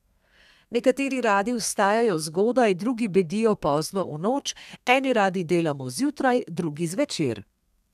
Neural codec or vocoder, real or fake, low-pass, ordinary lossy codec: codec, 32 kHz, 1.9 kbps, SNAC; fake; 14.4 kHz; none